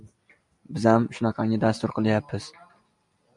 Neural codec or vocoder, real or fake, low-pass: none; real; 10.8 kHz